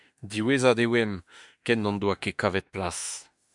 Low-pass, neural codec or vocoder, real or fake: 10.8 kHz; autoencoder, 48 kHz, 32 numbers a frame, DAC-VAE, trained on Japanese speech; fake